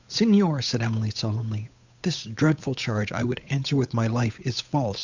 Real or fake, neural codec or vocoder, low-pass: fake; codec, 16 kHz, 8 kbps, FunCodec, trained on Chinese and English, 25 frames a second; 7.2 kHz